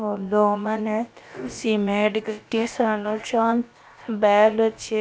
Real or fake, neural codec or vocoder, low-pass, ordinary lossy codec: fake; codec, 16 kHz, about 1 kbps, DyCAST, with the encoder's durations; none; none